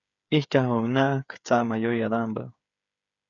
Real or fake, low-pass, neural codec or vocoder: fake; 7.2 kHz; codec, 16 kHz, 8 kbps, FreqCodec, smaller model